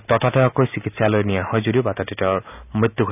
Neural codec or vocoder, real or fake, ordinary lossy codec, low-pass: none; real; none; 3.6 kHz